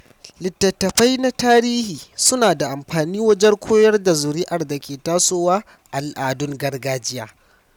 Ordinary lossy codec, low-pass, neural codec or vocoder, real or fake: none; 19.8 kHz; none; real